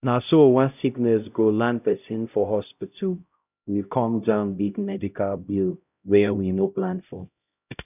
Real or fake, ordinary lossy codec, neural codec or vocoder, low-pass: fake; none; codec, 16 kHz, 0.5 kbps, X-Codec, HuBERT features, trained on LibriSpeech; 3.6 kHz